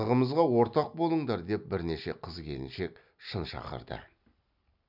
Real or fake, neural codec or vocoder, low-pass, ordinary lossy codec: real; none; 5.4 kHz; none